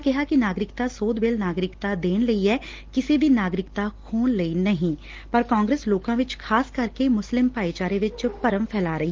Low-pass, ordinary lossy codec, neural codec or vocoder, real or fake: 7.2 kHz; Opus, 16 kbps; none; real